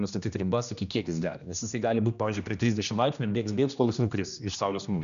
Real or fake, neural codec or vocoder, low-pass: fake; codec, 16 kHz, 1 kbps, X-Codec, HuBERT features, trained on general audio; 7.2 kHz